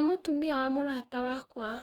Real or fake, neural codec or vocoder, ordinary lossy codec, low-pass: fake; codec, 44.1 kHz, 2.6 kbps, DAC; Opus, 64 kbps; 19.8 kHz